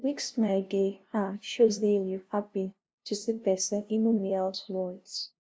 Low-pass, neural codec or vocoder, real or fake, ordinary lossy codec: none; codec, 16 kHz, 0.5 kbps, FunCodec, trained on LibriTTS, 25 frames a second; fake; none